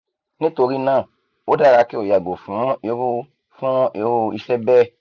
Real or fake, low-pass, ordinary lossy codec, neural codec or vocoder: real; 7.2 kHz; none; none